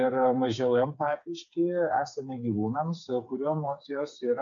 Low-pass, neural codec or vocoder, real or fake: 7.2 kHz; codec, 16 kHz, 4 kbps, FreqCodec, smaller model; fake